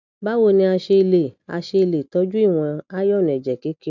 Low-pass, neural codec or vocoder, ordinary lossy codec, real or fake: 7.2 kHz; none; none; real